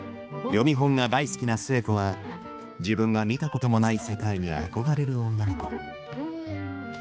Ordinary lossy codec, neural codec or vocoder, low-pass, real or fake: none; codec, 16 kHz, 2 kbps, X-Codec, HuBERT features, trained on balanced general audio; none; fake